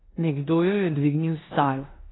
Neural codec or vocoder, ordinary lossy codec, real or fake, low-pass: codec, 16 kHz in and 24 kHz out, 0.9 kbps, LongCat-Audio-Codec, four codebook decoder; AAC, 16 kbps; fake; 7.2 kHz